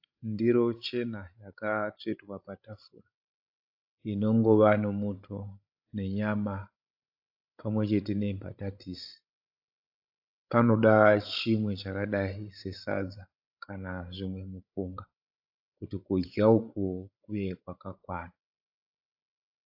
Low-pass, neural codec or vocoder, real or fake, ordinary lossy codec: 5.4 kHz; codec, 16 kHz, 16 kbps, FreqCodec, larger model; fake; AAC, 48 kbps